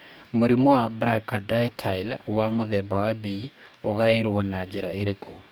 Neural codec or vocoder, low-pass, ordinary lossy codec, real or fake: codec, 44.1 kHz, 2.6 kbps, DAC; none; none; fake